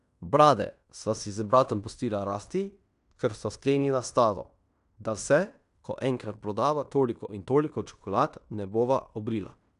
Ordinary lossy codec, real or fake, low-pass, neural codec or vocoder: none; fake; 10.8 kHz; codec, 16 kHz in and 24 kHz out, 0.9 kbps, LongCat-Audio-Codec, fine tuned four codebook decoder